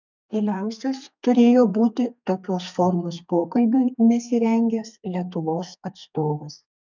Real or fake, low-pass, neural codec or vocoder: fake; 7.2 kHz; codec, 32 kHz, 1.9 kbps, SNAC